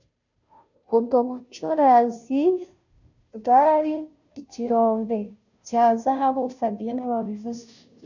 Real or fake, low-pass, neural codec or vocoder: fake; 7.2 kHz; codec, 16 kHz, 0.5 kbps, FunCodec, trained on Chinese and English, 25 frames a second